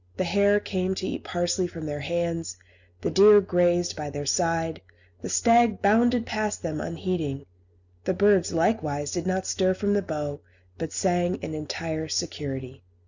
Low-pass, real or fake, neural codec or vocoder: 7.2 kHz; real; none